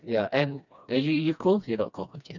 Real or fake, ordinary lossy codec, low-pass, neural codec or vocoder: fake; none; 7.2 kHz; codec, 16 kHz, 2 kbps, FreqCodec, smaller model